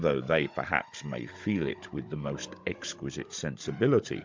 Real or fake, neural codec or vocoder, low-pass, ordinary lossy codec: fake; codec, 16 kHz, 16 kbps, FunCodec, trained on Chinese and English, 50 frames a second; 7.2 kHz; MP3, 64 kbps